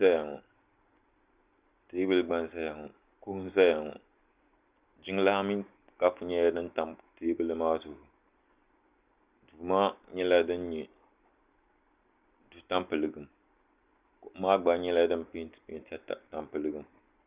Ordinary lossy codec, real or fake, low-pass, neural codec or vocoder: Opus, 64 kbps; real; 3.6 kHz; none